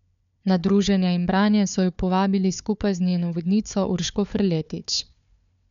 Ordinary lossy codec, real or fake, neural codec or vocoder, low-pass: none; fake; codec, 16 kHz, 4 kbps, FunCodec, trained on Chinese and English, 50 frames a second; 7.2 kHz